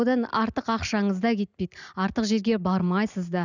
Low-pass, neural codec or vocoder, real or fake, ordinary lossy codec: 7.2 kHz; none; real; none